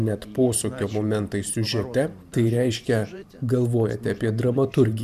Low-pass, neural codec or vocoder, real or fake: 14.4 kHz; vocoder, 44.1 kHz, 128 mel bands every 512 samples, BigVGAN v2; fake